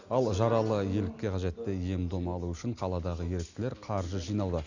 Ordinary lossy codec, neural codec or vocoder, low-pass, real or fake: none; none; 7.2 kHz; real